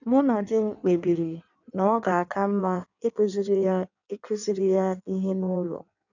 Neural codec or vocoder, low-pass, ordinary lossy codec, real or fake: codec, 16 kHz in and 24 kHz out, 1.1 kbps, FireRedTTS-2 codec; 7.2 kHz; none; fake